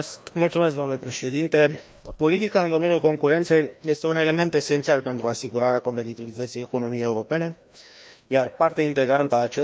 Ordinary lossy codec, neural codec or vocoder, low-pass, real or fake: none; codec, 16 kHz, 1 kbps, FreqCodec, larger model; none; fake